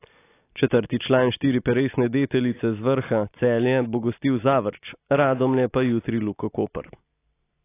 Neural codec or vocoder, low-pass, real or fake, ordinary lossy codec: none; 3.6 kHz; real; AAC, 24 kbps